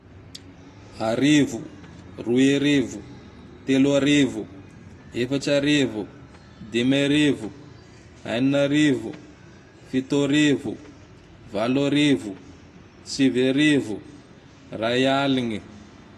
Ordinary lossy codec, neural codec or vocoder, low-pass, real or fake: AAC, 48 kbps; none; 14.4 kHz; real